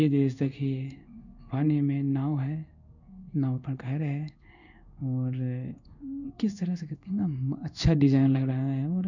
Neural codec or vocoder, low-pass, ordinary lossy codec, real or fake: codec, 16 kHz in and 24 kHz out, 1 kbps, XY-Tokenizer; 7.2 kHz; none; fake